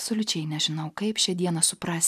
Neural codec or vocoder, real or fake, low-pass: none; real; 14.4 kHz